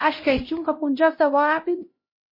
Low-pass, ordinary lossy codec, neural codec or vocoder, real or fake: 5.4 kHz; MP3, 24 kbps; codec, 16 kHz, 0.5 kbps, X-Codec, HuBERT features, trained on LibriSpeech; fake